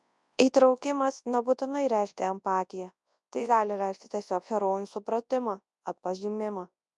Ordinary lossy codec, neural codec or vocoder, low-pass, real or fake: MP3, 96 kbps; codec, 24 kHz, 0.9 kbps, WavTokenizer, large speech release; 10.8 kHz; fake